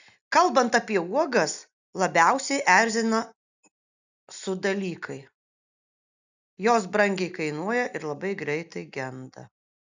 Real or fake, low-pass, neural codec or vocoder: real; 7.2 kHz; none